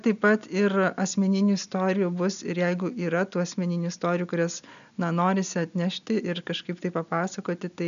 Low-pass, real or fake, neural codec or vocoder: 7.2 kHz; real; none